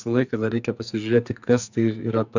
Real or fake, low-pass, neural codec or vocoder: fake; 7.2 kHz; codec, 44.1 kHz, 2.6 kbps, SNAC